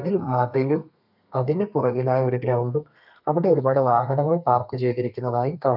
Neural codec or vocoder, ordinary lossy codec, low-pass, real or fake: codec, 32 kHz, 1.9 kbps, SNAC; none; 5.4 kHz; fake